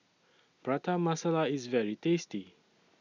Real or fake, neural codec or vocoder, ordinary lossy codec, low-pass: real; none; none; 7.2 kHz